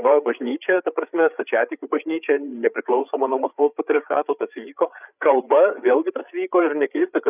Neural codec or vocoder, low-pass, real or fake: codec, 16 kHz, 8 kbps, FreqCodec, larger model; 3.6 kHz; fake